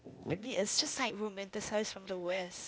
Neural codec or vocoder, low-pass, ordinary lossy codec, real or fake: codec, 16 kHz, 0.8 kbps, ZipCodec; none; none; fake